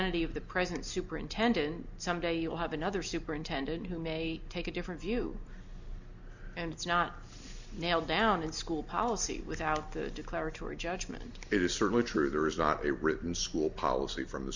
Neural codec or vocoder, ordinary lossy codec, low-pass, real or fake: none; Opus, 64 kbps; 7.2 kHz; real